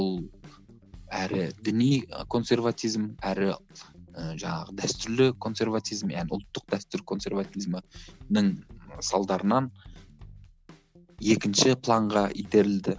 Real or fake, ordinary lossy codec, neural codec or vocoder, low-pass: real; none; none; none